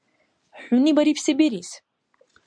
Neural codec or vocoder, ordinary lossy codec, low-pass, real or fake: none; MP3, 96 kbps; 9.9 kHz; real